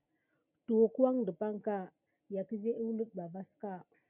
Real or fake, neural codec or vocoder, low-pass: real; none; 3.6 kHz